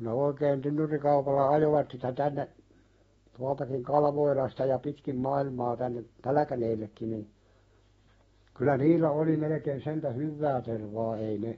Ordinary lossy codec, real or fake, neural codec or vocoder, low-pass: AAC, 24 kbps; fake; autoencoder, 48 kHz, 128 numbers a frame, DAC-VAE, trained on Japanese speech; 19.8 kHz